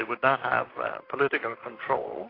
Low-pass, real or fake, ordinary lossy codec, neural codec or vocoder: 5.4 kHz; fake; AAC, 24 kbps; vocoder, 44.1 kHz, 128 mel bands, Pupu-Vocoder